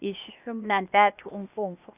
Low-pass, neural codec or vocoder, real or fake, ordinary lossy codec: 3.6 kHz; codec, 16 kHz, 0.8 kbps, ZipCodec; fake; none